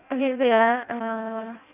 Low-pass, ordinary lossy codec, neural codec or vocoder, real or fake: 3.6 kHz; none; codec, 16 kHz in and 24 kHz out, 0.6 kbps, FireRedTTS-2 codec; fake